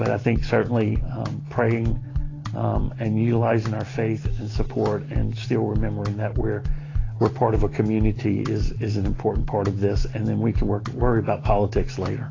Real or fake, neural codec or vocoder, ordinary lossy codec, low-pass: real; none; AAC, 32 kbps; 7.2 kHz